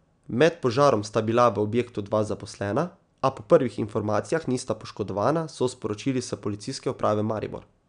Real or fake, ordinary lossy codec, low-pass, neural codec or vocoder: real; none; 9.9 kHz; none